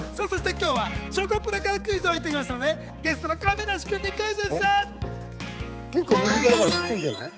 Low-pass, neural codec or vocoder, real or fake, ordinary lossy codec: none; codec, 16 kHz, 4 kbps, X-Codec, HuBERT features, trained on balanced general audio; fake; none